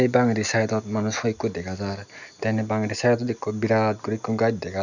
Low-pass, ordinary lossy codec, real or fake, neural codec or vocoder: 7.2 kHz; none; real; none